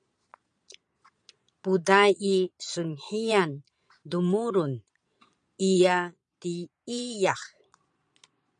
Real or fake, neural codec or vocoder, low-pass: fake; vocoder, 22.05 kHz, 80 mel bands, Vocos; 9.9 kHz